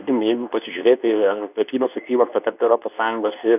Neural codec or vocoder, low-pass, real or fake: codec, 24 kHz, 0.9 kbps, WavTokenizer, medium speech release version 2; 3.6 kHz; fake